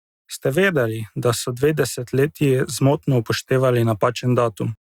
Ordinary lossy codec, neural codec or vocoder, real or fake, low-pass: Opus, 64 kbps; none; real; 19.8 kHz